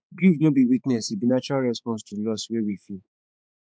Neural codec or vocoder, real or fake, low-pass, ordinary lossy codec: codec, 16 kHz, 4 kbps, X-Codec, HuBERT features, trained on balanced general audio; fake; none; none